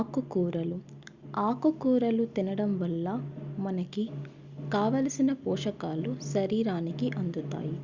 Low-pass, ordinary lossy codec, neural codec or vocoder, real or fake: 7.2 kHz; Opus, 64 kbps; none; real